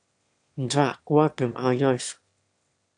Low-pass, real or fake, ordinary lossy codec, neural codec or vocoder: 9.9 kHz; fake; AAC, 64 kbps; autoencoder, 22.05 kHz, a latent of 192 numbers a frame, VITS, trained on one speaker